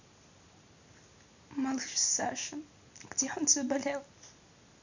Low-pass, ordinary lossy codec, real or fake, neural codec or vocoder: 7.2 kHz; none; real; none